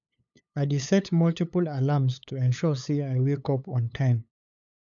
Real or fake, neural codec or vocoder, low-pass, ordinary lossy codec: fake; codec, 16 kHz, 8 kbps, FunCodec, trained on LibriTTS, 25 frames a second; 7.2 kHz; none